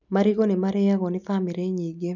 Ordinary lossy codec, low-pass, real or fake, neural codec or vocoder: none; 7.2 kHz; real; none